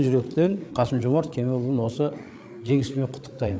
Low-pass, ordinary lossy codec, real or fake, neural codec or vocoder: none; none; fake; codec, 16 kHz, 8 kbps, FreqCodec, larger model